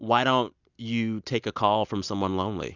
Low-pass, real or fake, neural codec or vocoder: 7.2 kHz; real; none